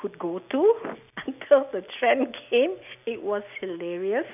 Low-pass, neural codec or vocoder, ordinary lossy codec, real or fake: 3.6 kHz; none; none; real